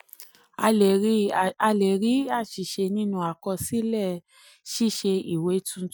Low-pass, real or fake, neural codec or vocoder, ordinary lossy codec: none; real; none; none